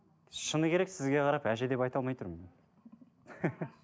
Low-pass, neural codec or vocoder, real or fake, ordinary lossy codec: none; none; real; none